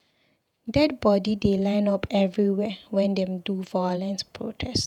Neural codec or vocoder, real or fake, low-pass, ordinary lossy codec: vocoder, 48 kHz, 128 mel bands, Vocos; fake; 19.8 kHz; none